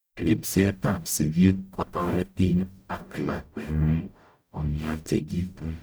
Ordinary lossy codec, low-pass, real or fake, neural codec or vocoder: none; none; fake; codec, 44.1 kHz, 0.9 kbps, DAC